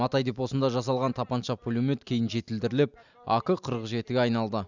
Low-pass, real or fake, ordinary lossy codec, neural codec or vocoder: 7.2 kHz; real; none; none